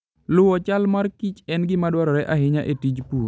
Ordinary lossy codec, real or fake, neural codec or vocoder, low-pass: none; real; none; none